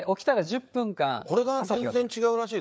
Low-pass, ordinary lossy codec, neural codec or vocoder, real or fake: none; none; codec, 16 kHz, 4 kbps, FreqCodec, larger model; fake